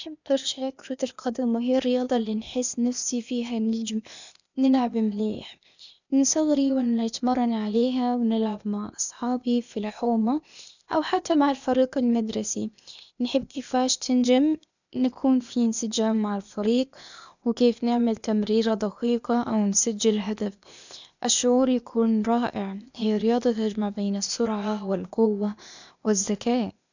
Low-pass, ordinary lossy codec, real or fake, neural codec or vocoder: 7.2 kHz; none; fake; codec, 16 kHz, 0.8 kbps, ZipCodec